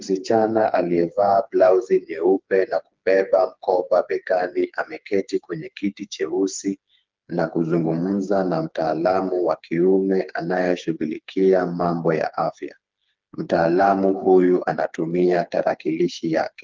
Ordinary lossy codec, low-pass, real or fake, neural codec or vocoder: Opus, 32 kbps; 7.2 kHz; fake; codec, 16 kHz, 4 kbps, FreqCodec, smaller model